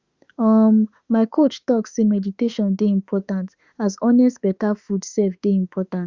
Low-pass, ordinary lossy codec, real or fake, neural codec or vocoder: 7.2 kHz; Opus, 64 kbps; fake; autoencoder, 48 kHz, 32 numbers a frame, DAC-VAE, trained on Japanese speech